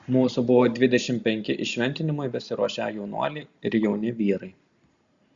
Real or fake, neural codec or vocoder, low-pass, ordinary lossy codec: real; none; 7.2 kHz; Opus, 64 kbps